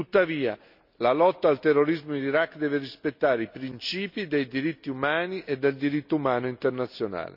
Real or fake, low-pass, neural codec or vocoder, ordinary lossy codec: real; 5.4 kHz; none; none